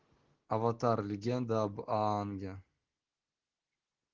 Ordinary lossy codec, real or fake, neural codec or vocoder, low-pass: Opus, 16 kbps; real; none; 7.2 kHz